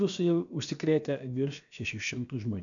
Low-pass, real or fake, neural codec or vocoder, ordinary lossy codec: 7.2 kHz; fake; codec, 16 kHz, about 1 kbps, DyCAST, with the encoder's durations; MP3, 96 kbps